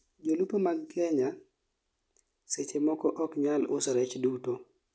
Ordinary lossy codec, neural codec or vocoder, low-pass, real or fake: none; none; none; real